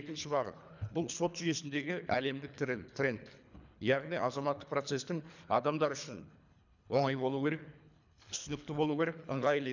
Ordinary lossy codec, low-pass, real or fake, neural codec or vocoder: none; 7.2 kHz; fake; codec, 24 kHz, 3 kbps, HILCodec